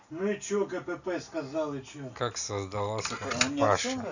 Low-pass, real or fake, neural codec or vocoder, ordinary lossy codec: 7.2 kHz; real; none; none